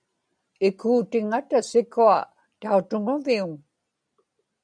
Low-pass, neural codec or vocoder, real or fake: 9.9 kHz; none; real